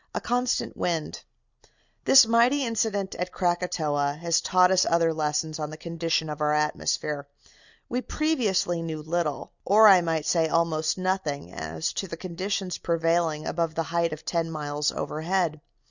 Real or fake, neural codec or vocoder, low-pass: real; none; 7.2 kHz